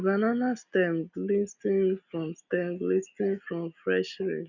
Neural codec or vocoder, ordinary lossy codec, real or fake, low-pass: none; none; real; 7.2 kHz